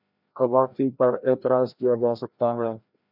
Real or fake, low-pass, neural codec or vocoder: fake; 5.4 kHz; codec, 16 kHz, 1 kbps, FreqCodec, larger model